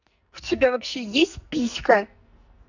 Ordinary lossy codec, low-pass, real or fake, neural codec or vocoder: none; 7.2 kHz; fake; codec, 44.1 kHz, 2.6 kbps, SNAC